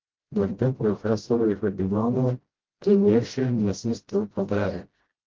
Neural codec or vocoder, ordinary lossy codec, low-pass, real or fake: codec, 16 kHz, 0.5 kbps, FreqCodec, smaller model; Opus, 16 kbps; 7.2 kHz; fake